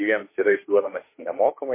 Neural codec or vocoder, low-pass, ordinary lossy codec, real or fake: autoencoder, 48 kHz, 32 numbers a frame, DAC-VAE, trained on Japanese speech; 3.6 kHz; MP3, 24 kbps; fake